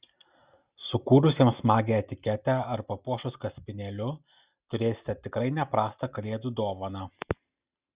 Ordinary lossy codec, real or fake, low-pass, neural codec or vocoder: Opus, 64 kbps; real; 3.6 kHz; none